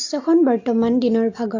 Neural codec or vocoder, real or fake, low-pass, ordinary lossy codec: none; real; 7.2 kHz; AAC, 48 kbps